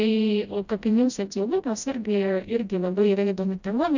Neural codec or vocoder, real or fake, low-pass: codec, 16 kHz, 0.5 kbps, FreqCodec, smaller model; fake; 7.2 kHz